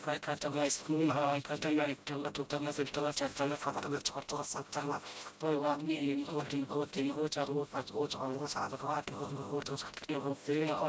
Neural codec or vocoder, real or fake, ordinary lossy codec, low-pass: codec, 16 kHz, 0.5 kbps, FreqCodec, smaller model; fake; none; none